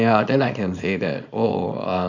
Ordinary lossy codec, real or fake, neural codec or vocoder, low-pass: none; fake; codec, 24 kHz, 0.9 kbps, WavTokenizer, small release; 7.2 kHz